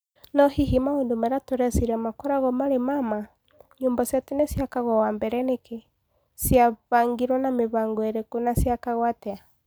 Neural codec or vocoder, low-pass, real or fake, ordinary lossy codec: none; none; real; none